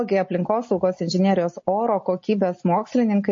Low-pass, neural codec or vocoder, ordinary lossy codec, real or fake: 7.2 kHz; none; MP3, 32 kbps; real